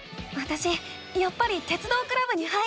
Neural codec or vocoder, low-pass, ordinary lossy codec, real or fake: none; none; none; real